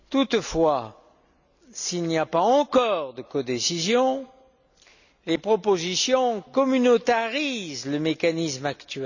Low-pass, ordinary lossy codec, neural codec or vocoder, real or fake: 7.2 kHz; none; none; real